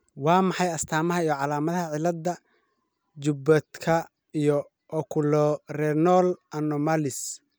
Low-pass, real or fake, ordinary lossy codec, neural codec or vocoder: none; real; none; none